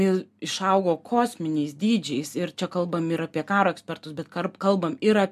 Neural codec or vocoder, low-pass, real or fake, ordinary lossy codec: none; 14.4 kHz; real; MP3, 96 kbps